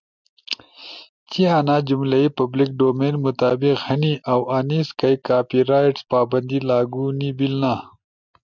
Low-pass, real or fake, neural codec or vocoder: 7.2 kHz; real; none